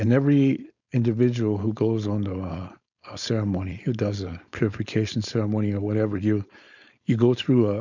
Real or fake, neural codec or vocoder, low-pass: fake; codec, 16 kHz, 4.8 kbps, FACodec; 7.2 kHz